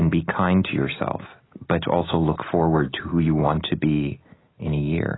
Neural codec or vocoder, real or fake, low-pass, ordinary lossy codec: none; real; 7.2 kHz; AAC, 16 kbps